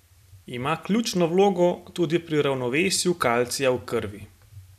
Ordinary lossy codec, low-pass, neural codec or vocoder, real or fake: none; 14.4 kHz; none; real